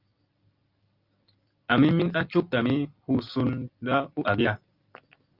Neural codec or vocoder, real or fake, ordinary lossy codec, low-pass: none; real; Opus, 24 kbps; 5.4 kHz